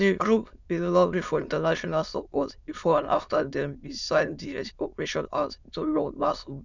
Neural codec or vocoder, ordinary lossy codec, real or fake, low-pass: autoencoder, 22.05 kHz, a latent of 192 numbers a frame, VITS, trained on many speakers; none; fake; 7.2 kHz